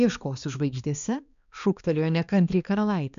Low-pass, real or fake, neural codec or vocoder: 7.2 kHz; fake; codec, 16 kHz, 2 kbps, X-Codec, HuBERT features, trained on balanced general audio